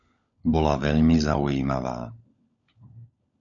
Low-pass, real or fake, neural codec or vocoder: 7.2 kHz; fake; codec, 16 kHz, 16 kbps, FunCodec, trained on LibriTTS, 50 frames a second